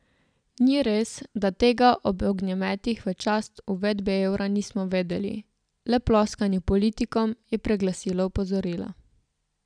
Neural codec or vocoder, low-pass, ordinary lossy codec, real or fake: none; 9.9 kHz; none; real